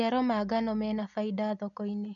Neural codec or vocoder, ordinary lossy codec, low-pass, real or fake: none; none; 7.2 kHz; real